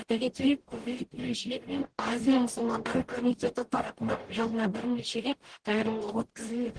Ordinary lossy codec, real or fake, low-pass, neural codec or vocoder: Opus, 16 kbps; fake; 9.9 kHz; codec, 44.1 kHz, 0.9 kbps, DAC